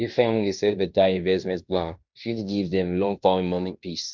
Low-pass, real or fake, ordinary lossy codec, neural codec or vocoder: 7.2 kHz; fake; MP3, 64 kbps; codec, 16 kHz in and 24 kHz out, 0.9 kbps, LongCat-Audio-Codec, fine tuned four codebook decoder